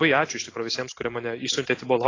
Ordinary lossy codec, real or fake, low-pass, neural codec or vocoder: AAC, 32 kbps; real; 7.2 kHz; none